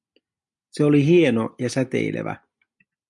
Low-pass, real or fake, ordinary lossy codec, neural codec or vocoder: 10.8 kHz; real; MP3, 64 kbps; none